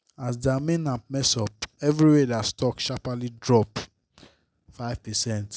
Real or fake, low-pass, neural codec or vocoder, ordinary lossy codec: real; none; none; none